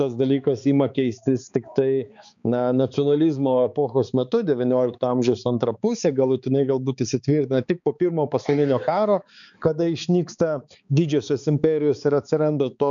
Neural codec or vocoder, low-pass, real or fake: codec, 16 kHz, 4 kbps, X-Codec, HuBERT features, trained on balanced general audio; 7.2 kHz; fake